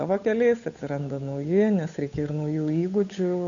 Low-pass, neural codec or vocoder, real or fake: 7.2 kHz; codec, 16 kHz, 8 kbps, FunCodec, trained on Chinese and English, 25 frames a second; fake